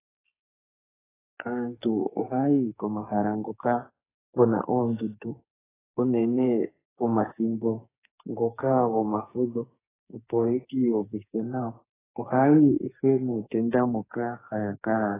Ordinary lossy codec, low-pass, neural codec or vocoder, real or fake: AAC, 16 kbps; 3.6 kHz; codec, 44.1 kHz, 2.6 kbps, SNAC; fake